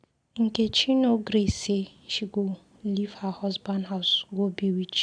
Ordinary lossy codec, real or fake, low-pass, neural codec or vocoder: none; real; 9.9 kHz; none